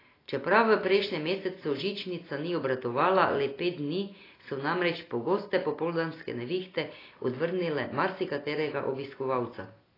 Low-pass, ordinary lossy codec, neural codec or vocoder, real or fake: 5.4 kHz; AAC, 24 kbps; none; real